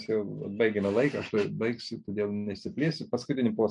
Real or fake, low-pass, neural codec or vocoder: real; 10.8 kHz; none